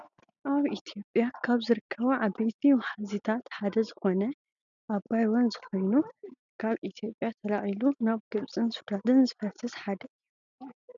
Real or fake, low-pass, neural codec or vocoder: real; 7.2 kHz; none